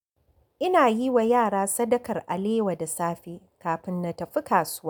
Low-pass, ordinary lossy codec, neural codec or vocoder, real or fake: none; none; none; real